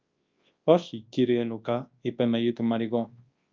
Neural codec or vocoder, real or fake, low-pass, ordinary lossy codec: codec, 24 kHz, 0.9 kbps, WavTokenizer, large speech release; fake; 7.2 kHz; Opus, 32 kbps